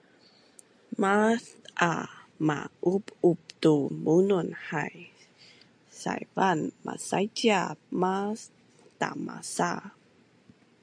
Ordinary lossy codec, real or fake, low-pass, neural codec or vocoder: AAC, 64 kbps; real; 9.9 kHz; none